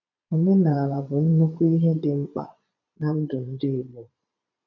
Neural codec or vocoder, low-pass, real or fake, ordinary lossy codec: vocoder, 22.05 kHz, 80 mel bands, Vocos; 7.2 kHz; fake; none